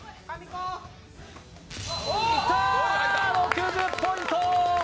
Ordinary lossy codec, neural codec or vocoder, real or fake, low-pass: none; none; real; none